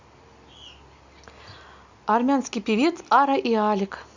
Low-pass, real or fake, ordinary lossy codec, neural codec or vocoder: 7.2 kHz; real; Opus, 64 kbps; none